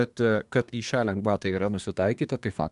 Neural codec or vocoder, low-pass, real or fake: codec, 24 kHz, 1 kbps, SNAC; 10.8 kHz; fake